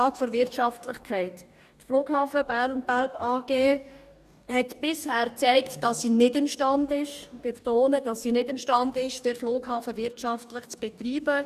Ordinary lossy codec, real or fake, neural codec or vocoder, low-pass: none; fake; codec, 44.1 kHz, 2.6 kbps, DAC; 14.4 kHz